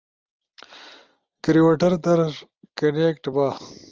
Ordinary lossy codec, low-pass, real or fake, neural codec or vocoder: Opus, 24 kbps; 7.2 kHz; real; none